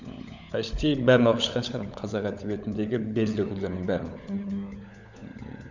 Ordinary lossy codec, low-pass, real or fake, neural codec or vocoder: none; 7.2 kHz; fake; codec, 16 kHz, 16 kbps, FunCodec, trained on LibriTTS, 50 frames a second